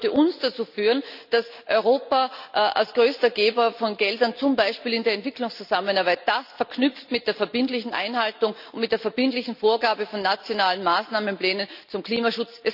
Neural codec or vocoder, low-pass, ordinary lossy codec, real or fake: none; 5.4 kHz; none; real